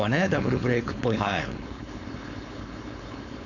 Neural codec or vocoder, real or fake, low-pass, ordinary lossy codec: codec, 16 kHz, 4.8 kbps, FACodec; fake; 7.2 kHz; none